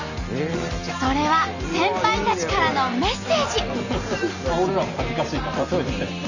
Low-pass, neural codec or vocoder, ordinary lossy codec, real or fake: 7.2 kHz; none; none; real